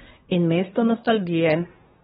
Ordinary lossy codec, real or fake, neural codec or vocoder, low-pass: AAC, 16 kbps; fake; codec, 24 kHz, 1 kbps, SNAC; 10.8 kHz